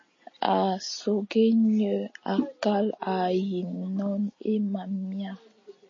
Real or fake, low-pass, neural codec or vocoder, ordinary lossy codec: real; 7.2 kHz; none; MP3, 32 kbps